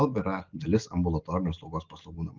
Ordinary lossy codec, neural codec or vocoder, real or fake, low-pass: Opus, 24 kbps; none; real; 7.2 kHz